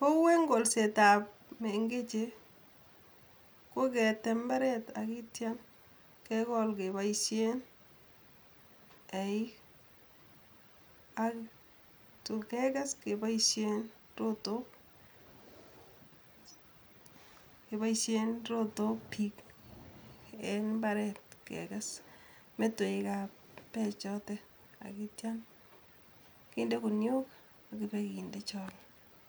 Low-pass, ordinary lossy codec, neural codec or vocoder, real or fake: none; none; none; real